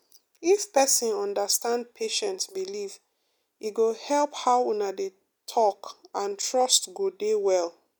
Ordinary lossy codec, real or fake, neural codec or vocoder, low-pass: none; real; none; none